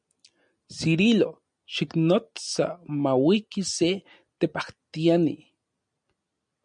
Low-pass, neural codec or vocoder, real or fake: 9.9 kHz; none; real